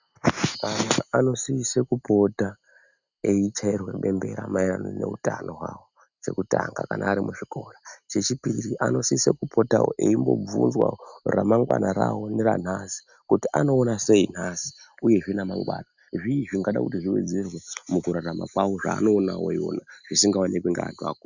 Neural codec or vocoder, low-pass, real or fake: none; 7.2 kHz; real